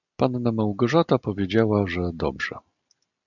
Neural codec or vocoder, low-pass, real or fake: none; 7.2 kHz; real